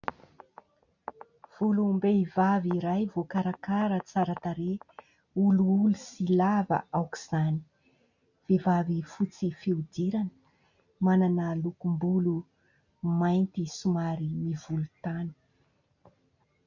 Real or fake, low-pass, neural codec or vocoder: real; 7.2 kHz; none